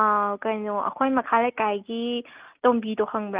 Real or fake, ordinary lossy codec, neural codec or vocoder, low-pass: real; Opus, 24 kbps; none; 3.6 kHz